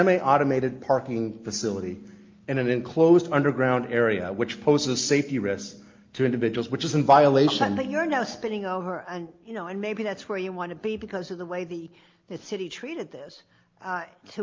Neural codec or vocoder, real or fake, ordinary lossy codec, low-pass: none; real; Opus, 24 kbps; 7.2 kHz